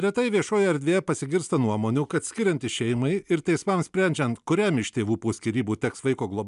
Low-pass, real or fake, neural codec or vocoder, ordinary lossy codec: 10.8 kHz; real; none; MP3, 96 kbps